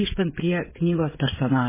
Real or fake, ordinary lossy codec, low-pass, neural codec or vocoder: fake; MP3, 16 kbps; 3.6 kHz; codec, 16 kHz, 16 kbps, FreqCodec, smaller model